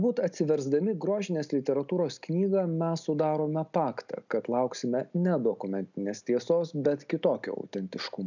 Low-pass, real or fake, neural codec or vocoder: 7.2 kHz; real; none